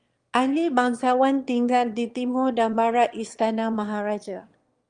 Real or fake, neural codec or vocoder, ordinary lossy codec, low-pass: fake; autoencoder, 22.05 kHz, a latent of 192 numbers a frame, VITS, trained on one speaker; Opus, 24 kbps; 9.9 kHz